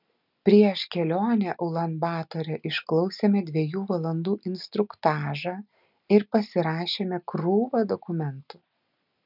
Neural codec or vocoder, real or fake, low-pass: none; real; 5.4 kHz